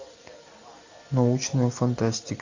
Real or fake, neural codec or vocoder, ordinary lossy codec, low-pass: real; none; AAC, 48 kbps; 7.2 kHz